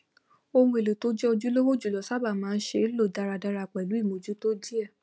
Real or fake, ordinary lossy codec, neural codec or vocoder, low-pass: real; none; none; none